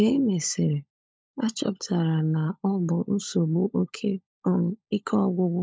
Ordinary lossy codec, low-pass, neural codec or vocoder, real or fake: none; none; codec, 16 kHz, 16 kbps, FunCodec, trained on LibriTTS, 50 frames a second; fake